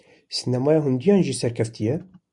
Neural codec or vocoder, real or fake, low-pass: none; real; 10.8 kHz